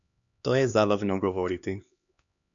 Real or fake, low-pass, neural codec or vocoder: fake; 7.2 kHz; codec, 16 kHz, 2 kbps, X-Codec, HuBERT features, trained on LibriSpeech